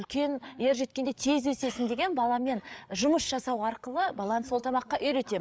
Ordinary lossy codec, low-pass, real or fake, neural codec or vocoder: none; none; fake; codec, 16 kHz, 8 kbps, FreqCodec, larger model